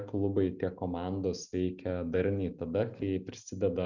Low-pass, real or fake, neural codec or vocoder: 7.2 kHz; real; none